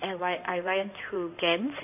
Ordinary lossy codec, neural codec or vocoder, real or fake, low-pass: none; codec, 16 kHz in and 24 kHz out, 2.2 kbps, FireRedTTS-2 codec; fake; 3.6 kHz